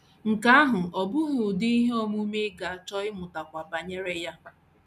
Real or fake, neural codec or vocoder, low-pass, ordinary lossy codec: real; none; 14.4 kHz; none